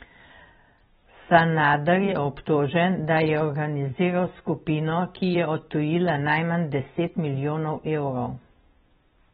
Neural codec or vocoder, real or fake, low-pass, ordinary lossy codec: vocoder, 44.1 kHz, 128 mel bands every 256 samples, BigVGAN v2; fake; 19.8 kHz; AAC, 16 kbps